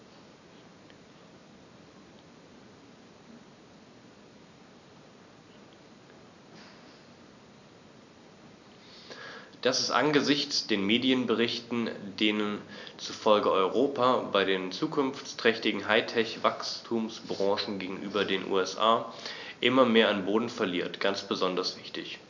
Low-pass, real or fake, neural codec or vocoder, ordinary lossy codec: 7.2 kHz; real; none; none